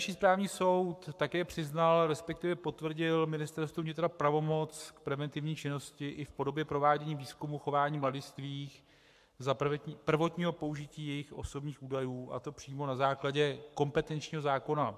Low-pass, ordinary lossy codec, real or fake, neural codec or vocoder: 14.4 kHz; AAC, 96 kbps; fake; codec, 44.1 kHz, 7.8 kbps, Pupu-Codec